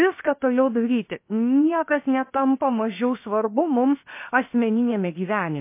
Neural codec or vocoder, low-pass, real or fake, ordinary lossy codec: codec, 16 kHz, 0.7 kbps, FocalCodec; 3.6 kHz; fake; MP3, 24 kbps